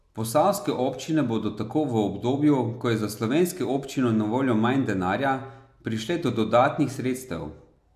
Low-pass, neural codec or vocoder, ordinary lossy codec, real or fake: 14.4 kHz; none; AAC, 96 kbps; real